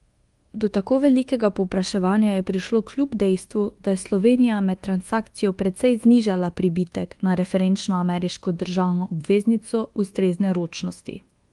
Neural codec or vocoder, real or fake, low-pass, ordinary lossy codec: codec, 24 kHz, 1.2 kbps, DualCodec; fake; 10.8 kHz; Opus, 24 kbps